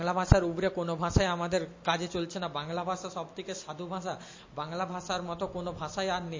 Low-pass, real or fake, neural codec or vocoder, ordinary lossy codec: 7.2 kHz; real; none; MP3, 32 kbps